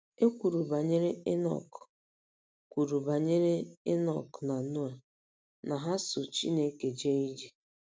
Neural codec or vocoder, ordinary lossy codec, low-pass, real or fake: none; none; none; real